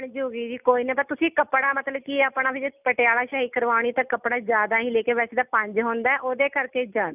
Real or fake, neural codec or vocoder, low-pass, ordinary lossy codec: real; none; 3.6 kHz; none